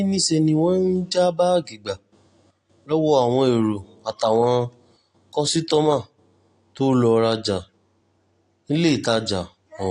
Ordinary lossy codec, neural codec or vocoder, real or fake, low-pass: AAC, 48 kbps; none; real; 9.9 kHz